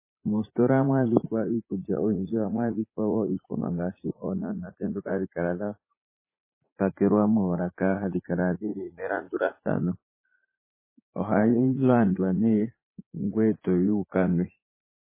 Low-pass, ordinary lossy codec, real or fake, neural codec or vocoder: 3.6 kHz; MP3, 16 kbps; fake; vocoder, 44.1 kHz, 80 mel bands, Vocos